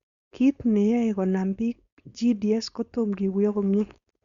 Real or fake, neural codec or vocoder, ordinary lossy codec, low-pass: fake; codec, 16 kHz, 4.8 kbps, FACodec; Opus, 64 kbps; 7.2 kHz